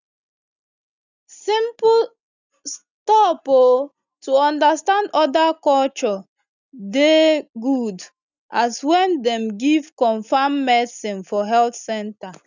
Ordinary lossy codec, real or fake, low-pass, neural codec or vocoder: none; real; 7.2 kHz; none